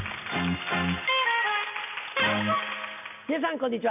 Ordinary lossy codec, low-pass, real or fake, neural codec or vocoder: none; 3.6 kHz; real; none